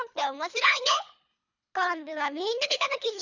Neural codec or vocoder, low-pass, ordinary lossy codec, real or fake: codec, 24 kHz, 3 kbps, HILCodec; 7.2 kHz; none; fake